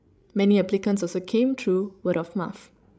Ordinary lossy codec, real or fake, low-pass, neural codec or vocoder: none; fake; none; codec, 16 kHz, 16 kbps, FreqCodec, larger model